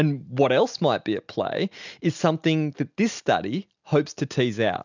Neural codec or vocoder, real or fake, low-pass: none; real; 7.2 kHz